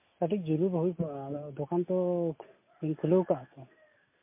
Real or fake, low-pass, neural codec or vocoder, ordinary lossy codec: real; 3.6 kHz; none; MP3, 24 kbps